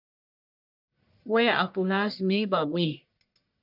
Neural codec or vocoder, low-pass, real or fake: codec, 44.1 kHz, 1.7 kbps, Pupu-Codec; 5.4 kHz; fake